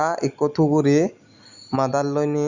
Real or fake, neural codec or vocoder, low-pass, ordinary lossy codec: real; none; 7.2 kHz; Opus, 64 kbps